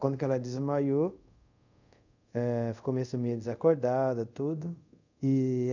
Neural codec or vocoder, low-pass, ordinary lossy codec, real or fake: codec, 24 kHz, 0.5 kbps, DualCodec; 7.2 kHz; none; fake